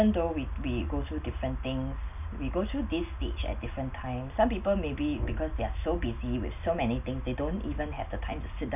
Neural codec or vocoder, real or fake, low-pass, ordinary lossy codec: none; real; 3.6 kHz; none